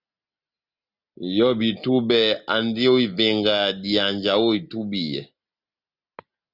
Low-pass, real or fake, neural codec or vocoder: 5.4 kHz; real; none